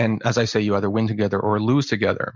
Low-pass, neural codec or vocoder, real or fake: 7.2 kHz; none; real